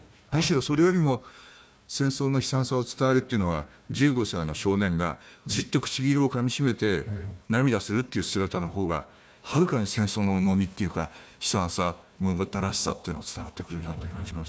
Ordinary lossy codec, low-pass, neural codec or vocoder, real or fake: none; none; codec, 16 kHz, 1 kbps, FunCodec, trained on Chinese and English, 50 frames a second; fake